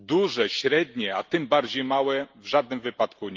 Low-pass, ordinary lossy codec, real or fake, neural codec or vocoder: 7.2 kHz; Opus, 24 kbps; real; none